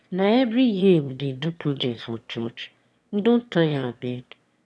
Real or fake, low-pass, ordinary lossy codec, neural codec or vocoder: fake; none; none; autoencoder, 22.05 kHz, a latent of 192 numbers a frame, VITS, trained on one speaker